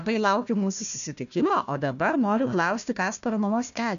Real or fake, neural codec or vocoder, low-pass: fake; codec, 16 kHz, 1 kbps, FunCodec, trained on Chinese and English, 50 frames a second; 7.2 kHz